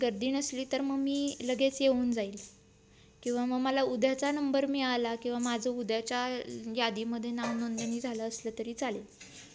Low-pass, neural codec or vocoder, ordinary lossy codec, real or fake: none; none; none; real